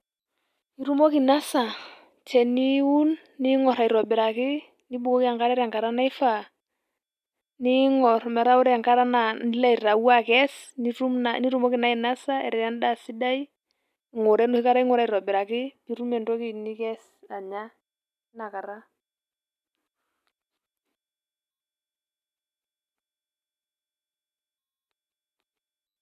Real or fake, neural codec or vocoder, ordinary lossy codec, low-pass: real; none; none; 14.4 kHz